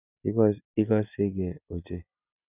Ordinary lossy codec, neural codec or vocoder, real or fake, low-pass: none; none; real; 3.6 kHz